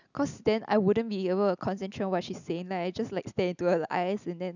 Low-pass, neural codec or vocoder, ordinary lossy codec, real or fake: 7.2 kHz; none; none; real